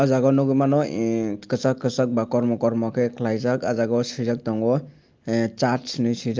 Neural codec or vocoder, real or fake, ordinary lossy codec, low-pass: none; real; Opus, 32 kbps; 7.2 kHz